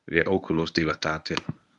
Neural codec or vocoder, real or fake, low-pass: codec, 24 kHz, 0.9 kbps, WavTokenizer, medium speech release version 1; fake; 10.8 kHz